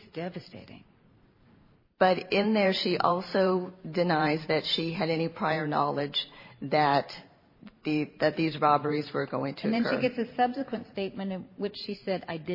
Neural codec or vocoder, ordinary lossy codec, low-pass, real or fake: vocoder, 44.1 kHz, 128 mel bands every 512 samples, BigVGAN v2; MP3, 24 kbps; 5.4 kHz; fake